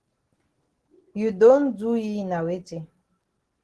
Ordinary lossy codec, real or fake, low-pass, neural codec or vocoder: Opus, 16 kbps; real; 10.8 kHz; none